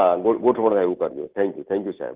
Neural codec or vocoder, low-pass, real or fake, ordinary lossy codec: none; 3.6 kHz; real; Opus, 16 kbps